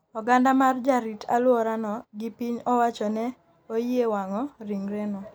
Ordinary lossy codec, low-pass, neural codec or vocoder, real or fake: none; none; none; real